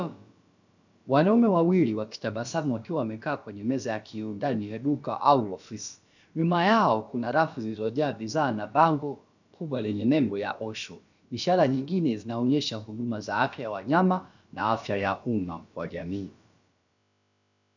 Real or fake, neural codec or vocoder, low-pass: fake; codec, 16 kHz, about 1 kbps, DyCAST, with the encoder's durations; 7.2 kHz